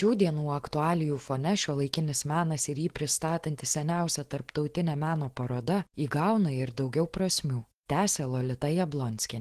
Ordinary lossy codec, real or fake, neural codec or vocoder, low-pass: Opus, 16 kbps; fake; autoencoder, 48 kHz, 128 numbers a frame, DAC-VAE, trained on Japanese speech; 14.4 kHz